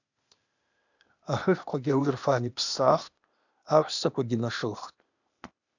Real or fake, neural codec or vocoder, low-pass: fake; codec, 16 kHz, 0.8 kbps, ZipCodec; 7.2 kHz